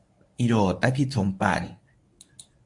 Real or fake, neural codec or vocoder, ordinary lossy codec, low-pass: fake; codec, 24 kHz, 0.9 kbps, WavTokenizer, medium speech release version 1; MP3, 64 kbps; 10.8 kHz